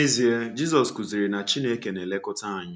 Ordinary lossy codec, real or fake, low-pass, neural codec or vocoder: none; real; none; none